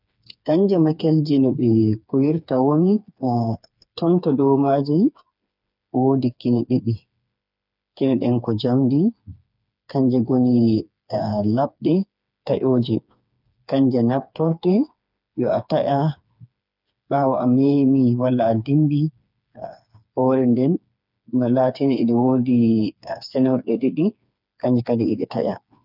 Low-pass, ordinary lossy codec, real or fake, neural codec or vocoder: 5.4 kHz; none; fake; codec, 16 kHz, 4 kbps, FreqCodec, smaller model